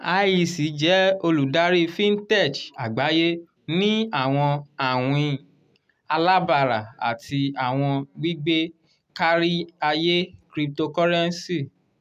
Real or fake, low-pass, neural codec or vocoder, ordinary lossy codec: real; 14.4 kHz; none; none